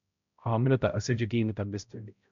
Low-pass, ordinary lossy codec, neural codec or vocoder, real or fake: 7.2 kHz; MP3, 64 kbps; codec, 16 kHz, 0.5 kbps, X-Codec, HuBERT features, trained on balanced general audio; fake